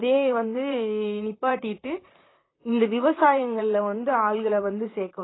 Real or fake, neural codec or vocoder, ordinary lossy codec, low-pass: fake; vocoder, 44.1 kHz, 128 mel bands, Pupu-Vocoder; AAC, 16 kbps; 7.2 kHz